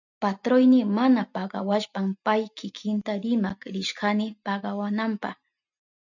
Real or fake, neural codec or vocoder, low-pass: real; none; 7.2 kHz